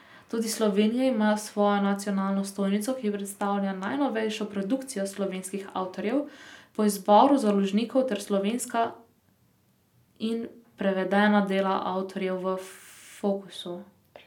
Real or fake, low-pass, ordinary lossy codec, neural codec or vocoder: real; 19.8 kHz; none; none